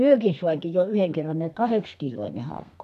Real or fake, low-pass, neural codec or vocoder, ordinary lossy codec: fake; 14.4 kHz; codec, 32 kHz, 1.9 kbps, SNAC; none